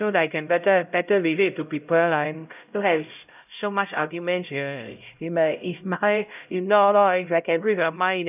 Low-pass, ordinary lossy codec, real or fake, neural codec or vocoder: 3.6 kHz; none; fake; codec, 16 kHz, 0.5 kbps, X-Codec, HuBERT features, trained on LibriSpeech